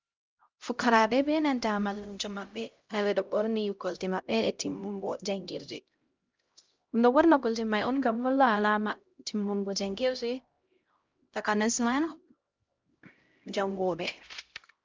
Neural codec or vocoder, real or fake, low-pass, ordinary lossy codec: codec, 16 kHz, 0.5 kbps, X-Codec, HuBERT features, trained on LibriSpeech; fake; 7.2 kHz; Opus, 24 kbps